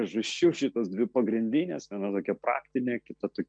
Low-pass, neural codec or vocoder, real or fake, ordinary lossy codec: 9.9 kHz; none; real; MP3, 64 kbps